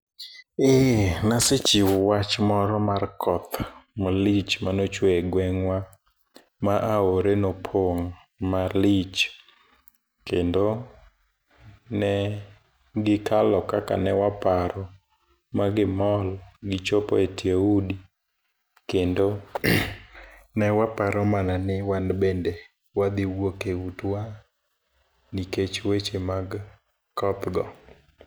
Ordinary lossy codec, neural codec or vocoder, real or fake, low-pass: none; none; real; none